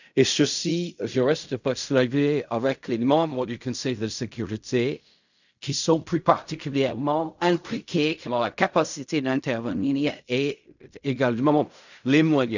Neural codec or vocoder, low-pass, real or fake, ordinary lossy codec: codec, 16 kHz in and 24 kHz out, 0.4 kbps, LongCat-Audio-Codec, fine tuned four codebook decoder; 7.2 kHz; fake; none